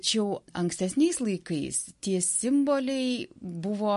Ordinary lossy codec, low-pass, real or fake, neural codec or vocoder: MP3, 48 kbps; 14.4 kHz; real; none